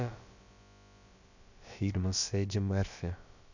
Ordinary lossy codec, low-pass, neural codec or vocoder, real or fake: none; 7.2 kHz; codec, 16 kHz, about 1 kbps, DyCAST, with the encoder's durations; fake